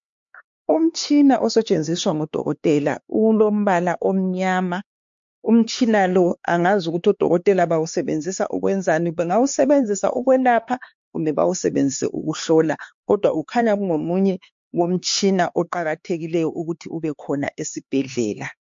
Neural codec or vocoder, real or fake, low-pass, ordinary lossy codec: codec, 16 kHz, 4 kbps, X-Codec, HuBERT features, trained on LibriSpeech; fake; 7.2 kHz; MP3, 48 kbps